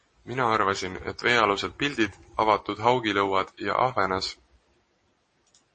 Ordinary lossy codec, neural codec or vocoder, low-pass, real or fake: MP3, 32 kbps; none; 10.8 kHz; real